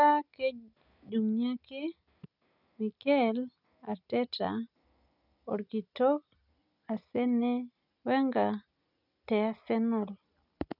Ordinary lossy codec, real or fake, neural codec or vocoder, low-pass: none; real; none; 5.4 kHz